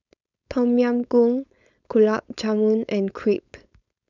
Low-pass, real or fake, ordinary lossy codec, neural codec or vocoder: 7.2 kHz; fake; none; codec, 16 kHz, 4.8 kbps, FACodec